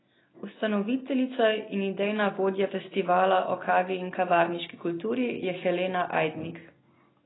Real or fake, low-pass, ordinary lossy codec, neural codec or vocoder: real; 7.2 kHz; AAC, 16 kbps; none